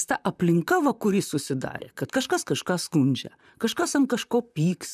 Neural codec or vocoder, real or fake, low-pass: vocoder, 44.1 kHz, 128 mel bands, Pupu-Vocoder; fake; 14.4 kHz